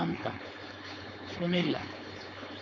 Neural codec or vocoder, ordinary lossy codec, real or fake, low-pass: codec, 16 kHz, 4.8 kbps, FACodec; none; fake; none